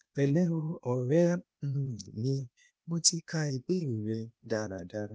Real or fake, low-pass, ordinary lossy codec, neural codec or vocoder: fake; none; none; codec, 16 kHz, 0.8 kbps, ZipCodec